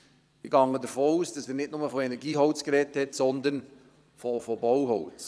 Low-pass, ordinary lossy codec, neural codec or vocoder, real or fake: none; none; vocoder, 22.05 kHz, 80 mel bands, Vocos; fake